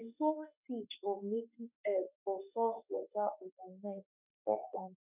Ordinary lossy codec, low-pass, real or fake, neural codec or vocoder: none; 3.6 kHz; fake; autoencoder, 48 kHz, 32 numbers a frame, DAC-VAE, trained on Japanese speech